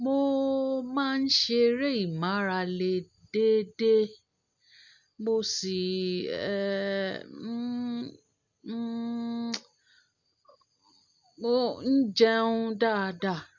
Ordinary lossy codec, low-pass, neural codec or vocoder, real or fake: none; 7.2 kHz; none; real